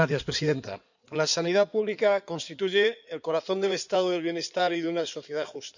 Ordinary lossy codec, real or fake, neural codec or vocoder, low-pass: none; fake; codec, 16 kHz in and 24 kHz out, 2.2 kbps, FireRedTTS-2 codec; 7.2 kHz